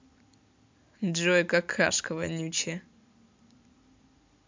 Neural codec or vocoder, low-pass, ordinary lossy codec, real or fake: none; 7.2 kHz; MP3, 64 kbps; real